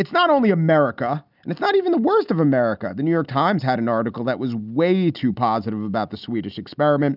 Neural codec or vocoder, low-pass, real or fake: none; 5.4 kHz; real